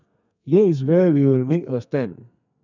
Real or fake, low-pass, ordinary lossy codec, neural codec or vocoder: fake; 7.2 kHz; none; codec, 32 kHz, 1.9 kbps, SNAC